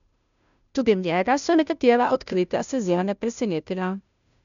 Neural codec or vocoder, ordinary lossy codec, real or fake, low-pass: codec, 16 kHz, 0.5 kbps, FunCodec, trained on Chinese and English, 25 frames a second; none; fake; 7.2 kHz